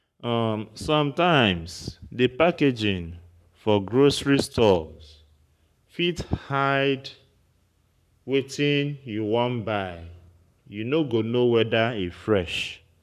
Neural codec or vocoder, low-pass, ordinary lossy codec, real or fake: codec, 44.1 kHz, 7.8 kbps, Pupu-Codec; 14.4 kHz; none; fake